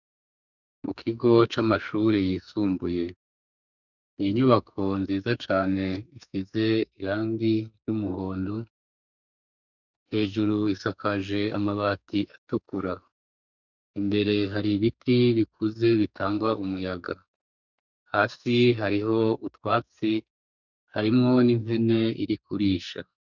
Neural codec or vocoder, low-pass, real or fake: codec, 44.1 kHz, 2.6 kbps, SNAC; 7.2 kHz; fake